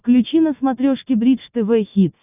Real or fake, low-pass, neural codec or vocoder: real; 3.6 kHz; none